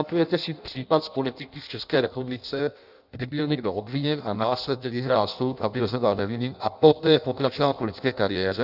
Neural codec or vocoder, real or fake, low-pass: codec, 16 kHz in and 24 kHz out, 0.6 kbps, FireRedTTS-2 codec; fake; 5.4 kHz